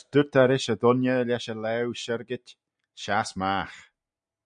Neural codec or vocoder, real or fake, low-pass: none; real; 9.9 kHz